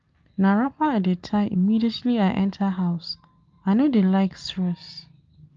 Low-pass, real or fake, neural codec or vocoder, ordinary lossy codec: 7.2 kHz; real; none; Opus, 32 kbps